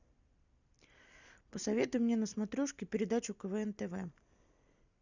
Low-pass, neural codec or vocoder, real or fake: 7.2 kHz; none; real